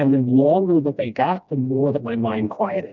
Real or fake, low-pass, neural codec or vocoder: fake; 7.2 kHz; codec, 16 kHz, 1 kbps, FreqCodec, smaller model